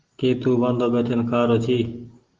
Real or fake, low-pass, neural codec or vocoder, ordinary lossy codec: real; 7.2 kHz; none; Opus, 16 kbps